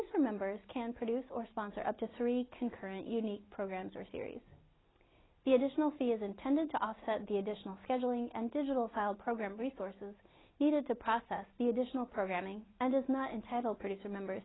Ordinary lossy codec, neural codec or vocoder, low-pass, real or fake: AAC, 16 kbps; none; 7.2 kHz; real